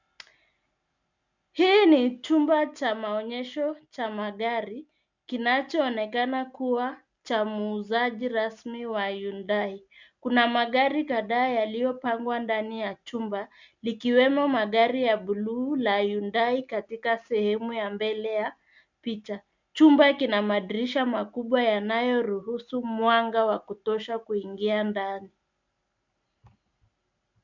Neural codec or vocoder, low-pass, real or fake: none; 7.2 kHz; real